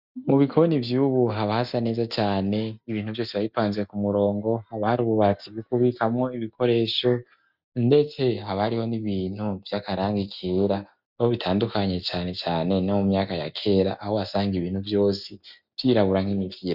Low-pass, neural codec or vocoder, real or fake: 5.4 kHz; none; real